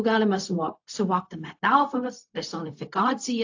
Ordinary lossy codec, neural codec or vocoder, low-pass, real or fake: AAC, 48 kbps; codec, 16 kHz, 0.4 kbps, LongCat-Audio-Codec; 7.2 kHz; fake